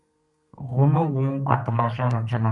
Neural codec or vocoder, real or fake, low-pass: codec, 32 kHz, 1.9 kbps, SNAC; fake; 10.8 kHz